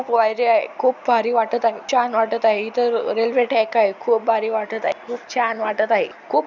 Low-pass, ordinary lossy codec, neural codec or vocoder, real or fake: 7.2 kHz; none; none; real